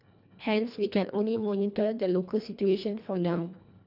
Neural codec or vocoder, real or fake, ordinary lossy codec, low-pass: codec, 24 kHz, 1.5 kbps, HILCodec; fake; none; 5.4 kHz